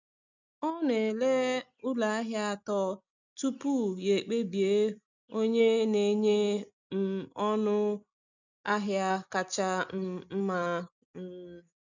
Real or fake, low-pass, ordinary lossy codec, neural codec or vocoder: real; 7.2 kHz; none; none